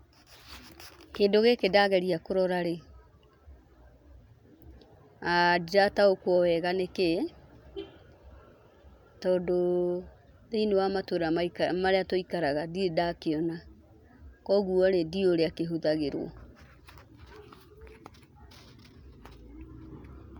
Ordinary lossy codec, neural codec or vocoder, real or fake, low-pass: none; none; real; 19.8 kHz